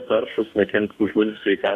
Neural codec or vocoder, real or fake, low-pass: codec, 44.1 kHz, 2.6 kbps, DAC; fake; 14.4 kHz